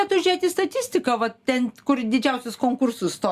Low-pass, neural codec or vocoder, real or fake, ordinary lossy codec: 14.4 kHz; none; real; Opus, 64 kbps